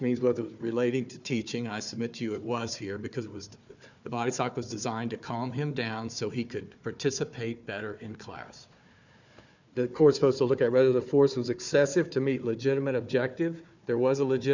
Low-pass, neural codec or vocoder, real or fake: 7.2 kHz; codec, 16 kHz, 4 kbps, FunCodec, trained on Chinese and English, 50 frames a second; fake